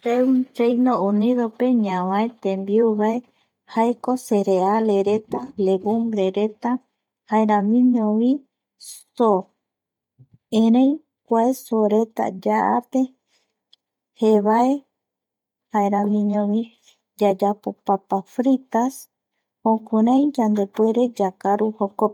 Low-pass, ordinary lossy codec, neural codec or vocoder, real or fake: 19.8 kHz; none; vocoder, 44.1 kHz, 128 mel bands every 512 samples, BigVGAN v2; fake